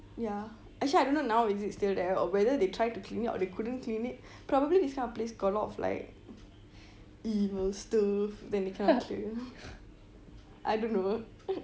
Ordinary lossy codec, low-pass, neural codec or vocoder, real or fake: none; none; none; real